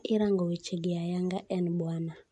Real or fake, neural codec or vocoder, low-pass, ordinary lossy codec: real; none; 10.8 kHz; MP3, 48 kbps